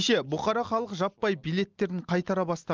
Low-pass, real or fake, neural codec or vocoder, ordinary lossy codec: 7.2 kHz; real; none; Opus, 24 kbps